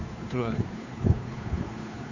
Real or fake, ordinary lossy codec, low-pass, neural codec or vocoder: fake; none; 7.2 kHz; codec, 16 kHz, 2 kbps, FunCodec, trained on Chinese and English, 25 frames a second